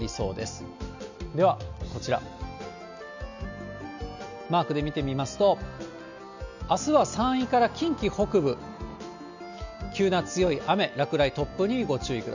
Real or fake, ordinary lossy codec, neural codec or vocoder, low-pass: real; none; none; 7.2 kHz